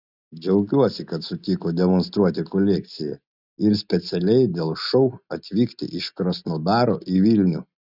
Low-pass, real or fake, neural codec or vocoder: 5.4 kHz; real; none